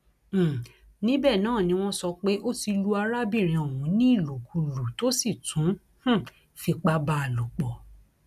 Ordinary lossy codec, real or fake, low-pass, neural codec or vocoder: none; real; 14.4 kHz; none